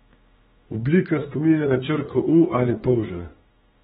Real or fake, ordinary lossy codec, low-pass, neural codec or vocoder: fake; AAC, 16 kbps; 19.8 kHz; autoencoder, 48 kHz, 32 numbers a frame, DAC-VAE, trained on Japanese speech